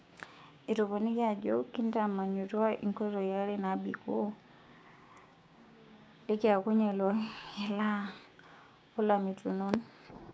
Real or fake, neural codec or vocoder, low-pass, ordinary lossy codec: fake; codec, 16 kHz, 6 kbps, DAC; none; none